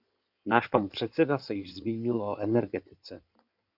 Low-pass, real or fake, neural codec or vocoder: 5.4 kHz; fake; codec, 16 kHz in and 24 kHz out, 2.2 kbps, FireRedTTS-2 codec